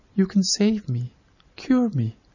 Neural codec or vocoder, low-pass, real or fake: none; 7.2 kHz; real